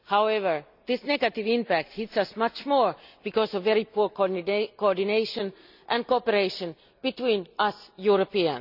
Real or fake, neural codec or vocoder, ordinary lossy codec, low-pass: real; none; none; 5.4 kHz